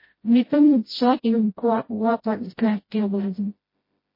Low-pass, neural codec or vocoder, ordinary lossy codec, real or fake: 5.4 kHz; codec, 16 kHz, 0.5 kbps, FreqCodec, smaller model; MP3, 24 kbps; fake